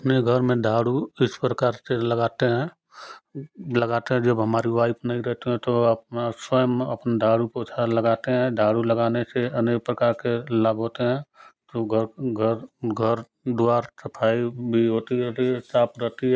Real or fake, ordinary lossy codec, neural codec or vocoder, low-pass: real; none; none; none